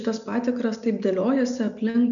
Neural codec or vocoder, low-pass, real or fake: none; 7.2 kHz; real